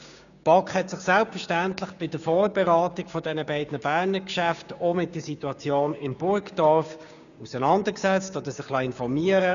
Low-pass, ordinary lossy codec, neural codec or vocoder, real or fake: 7.2 kHz; none; codec, 16 kHz, 6 kbps, DAC; fake